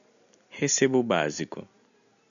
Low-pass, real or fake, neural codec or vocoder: 7.2 kHz; real; none